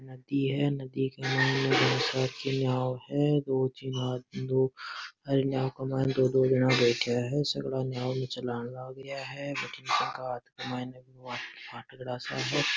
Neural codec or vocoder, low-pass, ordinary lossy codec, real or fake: none; none; none; real